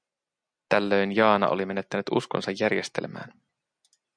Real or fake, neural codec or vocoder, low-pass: real; none; 9.9 kHz